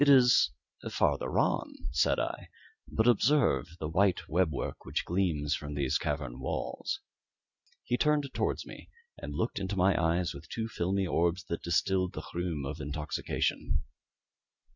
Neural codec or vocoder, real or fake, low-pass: none; real; 7.2 kHz